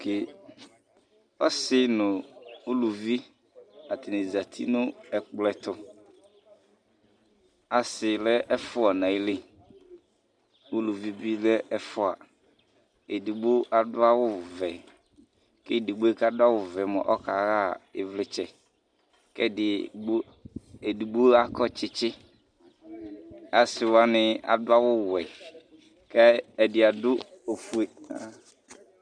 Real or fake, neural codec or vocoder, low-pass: real; none; 9.9 kHz